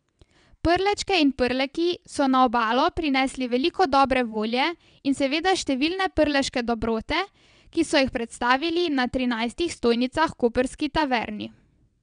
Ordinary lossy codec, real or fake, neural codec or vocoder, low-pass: none; fake; vocoder, 22.05 kHz, 80 mel bands, WaveNeXt; 9.9 kHz